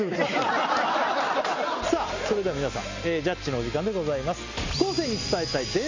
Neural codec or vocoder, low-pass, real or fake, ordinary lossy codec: none; 7.2 kHz; real; AAC, 48 kbps